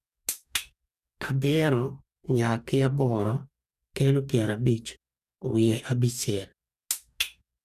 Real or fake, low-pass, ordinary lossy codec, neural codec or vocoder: fake; 14.4 kHz; none; codec, 44.1 kHz, 2.6 kbps, DAC